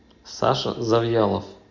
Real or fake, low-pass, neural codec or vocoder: real; 7.2 kHz; none